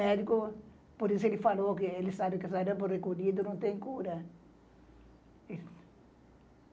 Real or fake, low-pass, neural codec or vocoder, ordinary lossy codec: real; none; none; none